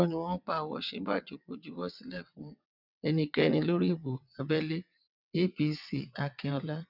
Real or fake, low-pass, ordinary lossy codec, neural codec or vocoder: fake; 5.4 kHz; none; vocoder, 22.05 kHz, 80 mel bands, WaveNeXt